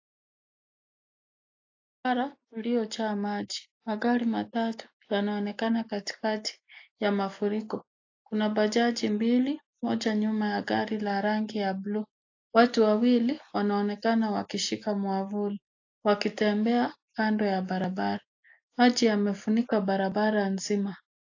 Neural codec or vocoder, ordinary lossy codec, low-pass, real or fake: none; AAC, 48 kbps; 7.2 kHz; real